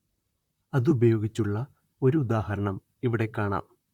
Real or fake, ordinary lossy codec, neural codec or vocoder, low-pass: fake; Opus, 64 kbps; vocoder, 44.1 kHz, 128 mel bands, Pupu-Vocoder; 19.8 kHz